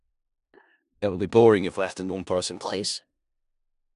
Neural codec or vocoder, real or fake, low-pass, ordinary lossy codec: codec, 16 kHz in and 24 kHz out, 0.4 kbps, LongCat-Audio-Codec, four codebook decoder; fake; 10.8 kHz; none